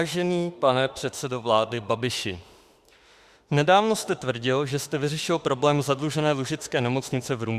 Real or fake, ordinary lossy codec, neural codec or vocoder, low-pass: fake; Opus, 64 kbps; autoencoder, 48 kHz, 32 numbers a frame, DAC-VAE, trained on Japanese speech; 14.4 kHz